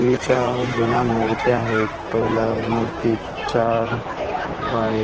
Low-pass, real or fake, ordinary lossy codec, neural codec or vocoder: 7.2 kHz; fake; Opus, 16 kbps; vocoder, 44.1 kHz, 128 mel bands, Pupu-Vocoder